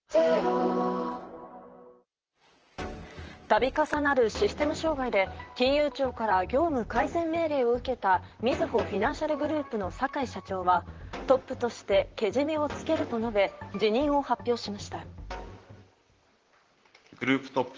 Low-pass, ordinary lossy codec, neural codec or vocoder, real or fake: 7.2 kHz; Opus, 16 kbps; vocoder, 44.1 kHz, 128 mel bands, Pupu-Vocoder; fake